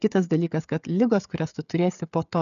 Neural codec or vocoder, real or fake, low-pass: codec, 16 kHz, 16 kbps, FreqCodec, smaller model; fake; 7.2 kHz